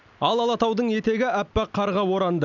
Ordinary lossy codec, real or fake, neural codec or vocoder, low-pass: none; real; none; 7.2 kHz